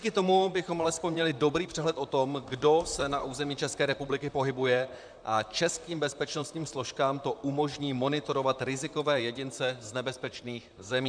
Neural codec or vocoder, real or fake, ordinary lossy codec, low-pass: vocoder, 44.1 kHz, 128 mel bands, Pupu-Vocoder; fake; MP3, 96 kbps; 9.9 kHz